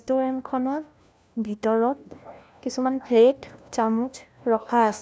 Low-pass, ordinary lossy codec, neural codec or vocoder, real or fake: none; none; codec, 16 kHz, 1 kbps, FunCodec, trained on LibriTTS, 50 frames a second; fake